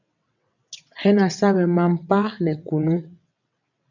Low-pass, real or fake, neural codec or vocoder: 7.2 kHz; fake; vocoder, 22.05 kHz, 80 mel bands, WaveNeXt